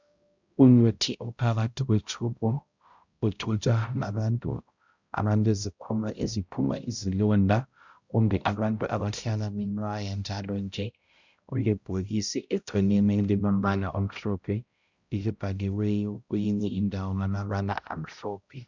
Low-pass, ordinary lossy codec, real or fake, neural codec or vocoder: 7.2 kHz; Opus, 64 kbps; fake; codec, 16 kHz, 0.5 kbps, X-Codec, HuBERT features, trained on balanced general audio